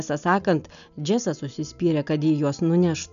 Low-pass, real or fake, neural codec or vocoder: 7.2 kHz; real; none